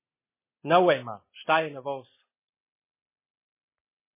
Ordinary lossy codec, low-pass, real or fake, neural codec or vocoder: MP3, 16 kbps; 3.6 kHz; real; none